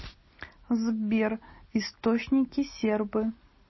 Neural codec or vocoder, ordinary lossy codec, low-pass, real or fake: none; MP3, 24 kbps; 7.2 kHz; real